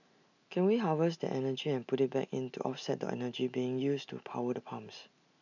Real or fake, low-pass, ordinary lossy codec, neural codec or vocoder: real; 7.2 kHz; none; none